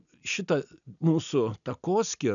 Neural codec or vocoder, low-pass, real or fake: none; 7.2 kHz; real